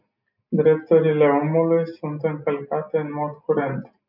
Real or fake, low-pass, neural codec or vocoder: real; 5.4 kHz; none